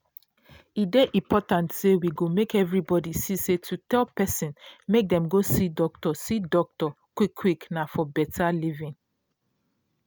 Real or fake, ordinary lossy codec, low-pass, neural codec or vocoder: real; none; none; none